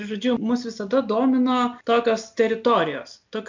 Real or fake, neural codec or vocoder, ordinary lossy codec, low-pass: real; none; MP3, 64 kbps; 7.2 kHz